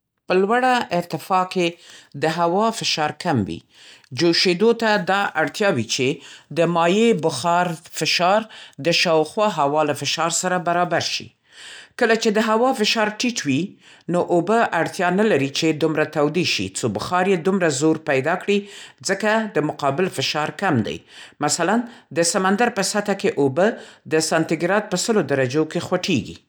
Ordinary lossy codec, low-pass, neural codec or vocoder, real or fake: none; none; none; real